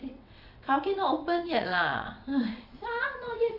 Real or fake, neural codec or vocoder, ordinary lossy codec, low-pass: fake; vocoder, 22.05 kHz, 80 mel bands, WaveNeXt; none; 5.4 kHz